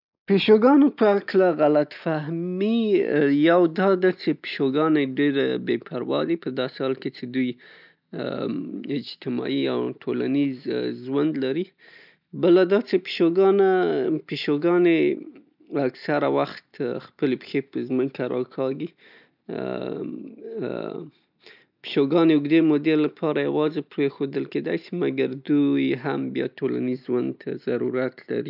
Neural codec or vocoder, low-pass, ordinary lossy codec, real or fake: none; 5.4 kHz; none; real